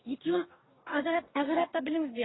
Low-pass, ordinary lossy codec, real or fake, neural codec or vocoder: 7.2 kHz; AAC, 16 kbps; fake; codec, 44.1 kHz, 2.6 kbps, DAC